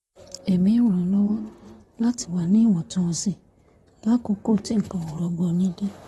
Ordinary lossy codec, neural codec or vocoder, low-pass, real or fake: AAC, 32 kbps; vocoder, 44.1 kHz, 128 mel bands, Pupu-Vocoder; 19.8 kHz; fake